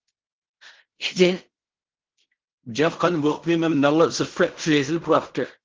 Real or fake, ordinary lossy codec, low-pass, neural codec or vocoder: fake; Opus, 16 kbps; 7.2 kHz; codec, 16 kHz in and 24 kHz out, 0.4 kbps, LongCat-Audio-Codec, fine tuned four codebook decoder